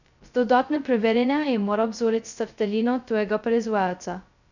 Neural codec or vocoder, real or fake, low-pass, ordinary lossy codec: codec, 16 kHz, 0.2 kbps, FocalCodec; fake; 7.2 kHz; none